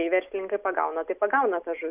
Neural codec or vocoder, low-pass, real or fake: none; 3.6 kHz; real